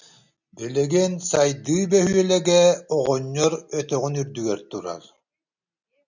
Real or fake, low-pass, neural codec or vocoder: real; 7.2 kHz; none